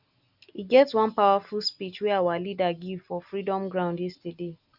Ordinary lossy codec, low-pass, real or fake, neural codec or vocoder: none; 5.4 kHz; real; none